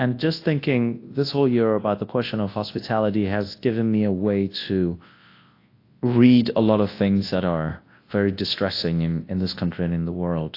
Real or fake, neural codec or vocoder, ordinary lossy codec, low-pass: fake; codec, 24 kHz, 0.9 kbps, WavTokenizer, large speech release; AAC, 32 kbps; 5.4 kHz